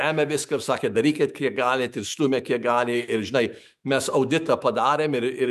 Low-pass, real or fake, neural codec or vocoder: 14.4 kHz; fake; autoencoder, 48 kHz, 128 numbers a frame, DAC-VAE, trained on Japanese speech